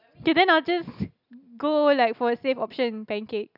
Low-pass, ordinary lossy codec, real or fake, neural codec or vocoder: 5.4 kHz; none; real; none